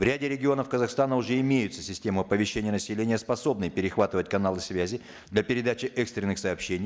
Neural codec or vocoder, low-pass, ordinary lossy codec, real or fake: none; none; none; real